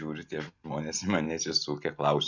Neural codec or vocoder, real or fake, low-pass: none; real; 7.2 kHz